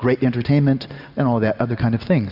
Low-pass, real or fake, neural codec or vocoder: 5.4 kHz; real; none